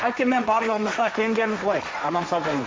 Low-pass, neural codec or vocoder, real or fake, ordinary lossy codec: none; codec, 16 kHz, 1.1 kbps, Voila-Tokenizer; fake; none